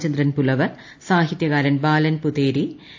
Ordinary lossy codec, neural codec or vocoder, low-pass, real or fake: AAC, 48 kbps; none; 7.2 kHz; real